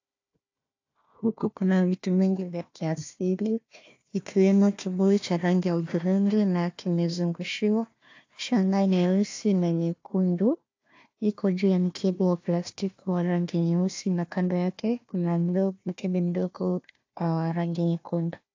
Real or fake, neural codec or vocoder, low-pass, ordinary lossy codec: fake; codec, 16 kHz, 1 kbps, FunCodec, trained on Chinese and English, 50 frames a second; 7.2 kHz; AAC, 48 kbps